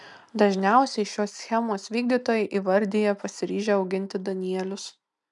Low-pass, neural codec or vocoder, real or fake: 10.8 kHz; none; real